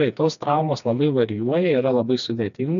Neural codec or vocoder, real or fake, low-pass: codec, 16 kHz, 2 kbps, FreqCodec, smaller model; fake; 7.2 kHz